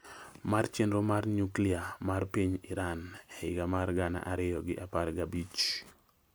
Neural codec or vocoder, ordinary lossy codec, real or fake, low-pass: none; none; real; none